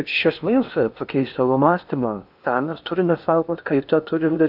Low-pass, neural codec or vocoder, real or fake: 5.4 kHz; codec, 16 kHz in and 24 kHz out, 0.8 kbps, FocalCodec, streaming, 65536 codes; fake